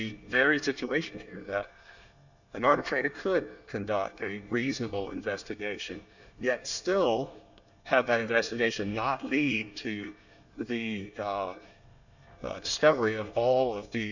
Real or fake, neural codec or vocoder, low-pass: fake; codec, 24 kHz, 1 kbps, SNAC; 7.2 kHz